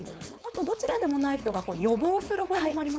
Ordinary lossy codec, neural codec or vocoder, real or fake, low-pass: none; codec, 16 kHz, 4.8 kbps, FACodec; fake; none